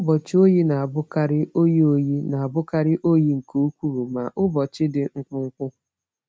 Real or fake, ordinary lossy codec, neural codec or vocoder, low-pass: real; none; none; none